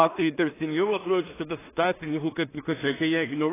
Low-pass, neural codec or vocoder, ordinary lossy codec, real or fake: 3.6 kHz; codec, 16 kHz in and 24 kHz out, 0.4 kbps, LongCat-Audio-Codec, two codebook decoder; AAC, 16 kbps; fake